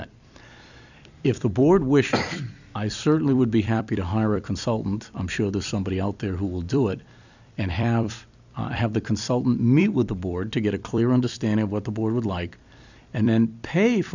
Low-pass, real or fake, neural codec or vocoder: 7.2 kHz; fake; vocoder, 44.1 kHz, 128 mel bands every 256 samples, BigVGAN v2